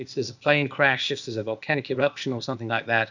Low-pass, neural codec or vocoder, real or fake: 7.2 kHz; codec, 16 kHz, 0.8 kbps, ZipCodec; fake